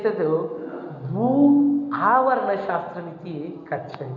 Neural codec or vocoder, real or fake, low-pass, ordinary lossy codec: none; real; 7.2 kHz; none